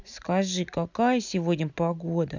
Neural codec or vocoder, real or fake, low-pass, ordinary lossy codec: none; real; 7.2 kHz; none